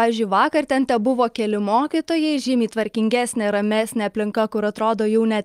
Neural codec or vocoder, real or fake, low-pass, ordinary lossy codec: none; real; 14.4 kHz; Opus, 32 kbps